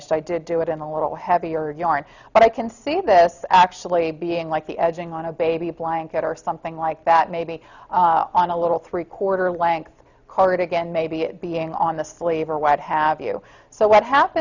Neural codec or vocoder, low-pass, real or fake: none; 7.2 kHz; real